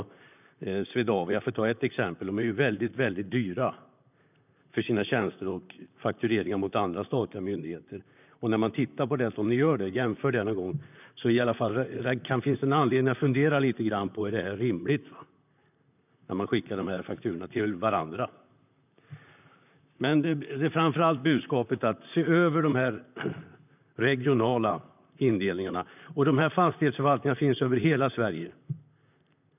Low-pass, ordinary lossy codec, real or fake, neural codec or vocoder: 3.6 kHz; none; fake; vocoder, 44.1 kHz, 128 mel bands, Pupu-Vocoder